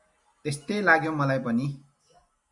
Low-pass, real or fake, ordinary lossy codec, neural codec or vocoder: 10.8 kHz; real; Opus, 64 kbps; none